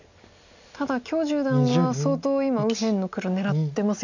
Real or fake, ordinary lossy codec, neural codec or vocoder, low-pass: real; none; none; 7.2 kHz